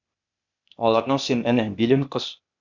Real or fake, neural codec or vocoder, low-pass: fake; codec, 16 kHz, 0.8 kbps, ZipCodec; 7.2 kHz